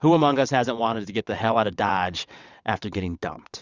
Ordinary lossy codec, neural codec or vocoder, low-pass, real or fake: Opus, 64 kbps; vocoder, 22.05 kHz, 80 mel bands, WaveNeXt; 7.2 kHz; fake